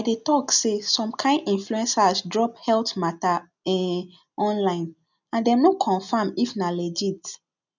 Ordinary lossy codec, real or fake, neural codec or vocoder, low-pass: none; real; none; 7.2 kHz